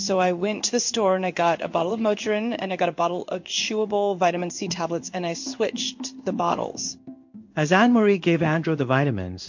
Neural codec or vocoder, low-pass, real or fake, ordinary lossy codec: codec, 16 kHz in and 24 kHz out, 1 kbps, XY-Tokenizer; 7.2 kHz; fake; MP3, 48 kbps